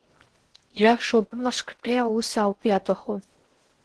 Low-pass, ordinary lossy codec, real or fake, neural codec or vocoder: 10.8 kHz; Opus, 16 kbps; fake; codec, 16 kHz in and 24 kHz out, 0.6 kbps, FocalCodec, streaming, 4096 codes